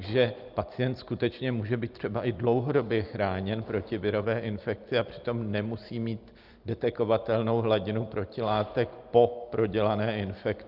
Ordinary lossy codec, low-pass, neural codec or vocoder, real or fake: Opus, 32 kbps; 5.4 kHz; none; real